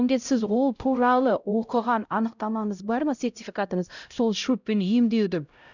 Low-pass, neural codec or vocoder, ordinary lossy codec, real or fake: 7.2 kHz; codec, 16 kHz, 0.5 kbps, X-Codec, HuBERT features, trained on LibriSpeech; none; fake